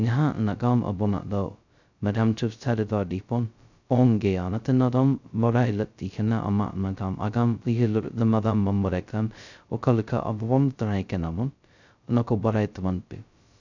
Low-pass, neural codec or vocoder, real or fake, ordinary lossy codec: 7.2 kHz; codec, 16 kHz, 0.2 kbps, FocalCodec; fake; none